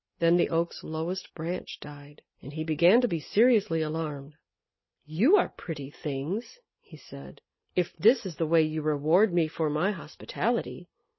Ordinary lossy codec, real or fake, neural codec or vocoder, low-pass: MP3, 24 kbps; real; none; 7.2 kHz